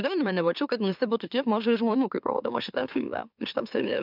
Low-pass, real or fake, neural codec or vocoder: 5.4 kHz; fake; autoencoder, 44.1 kHz, a latent of 192 numbers a frame, MeloTTS